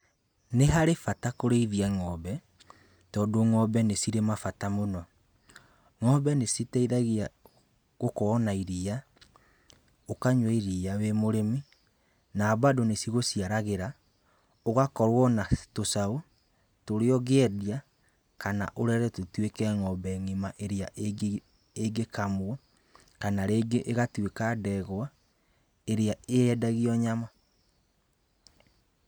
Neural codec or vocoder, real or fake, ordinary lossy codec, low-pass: none; real; none; none